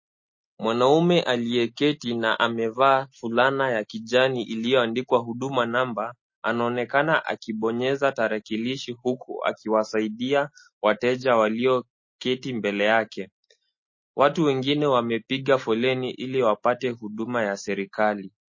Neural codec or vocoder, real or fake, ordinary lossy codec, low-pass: none; real; MP3, 32 kbps; 7.2 kHz